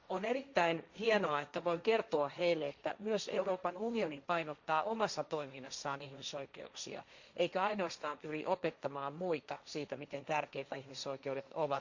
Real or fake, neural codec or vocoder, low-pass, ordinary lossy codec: fake; codec, 16 kHz, 1.1 kbps, Voila-Tokenizer; 7.2 kHz; Opus, 64 kbps